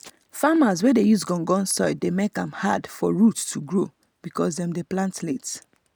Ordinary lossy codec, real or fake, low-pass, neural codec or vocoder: none; real; none; none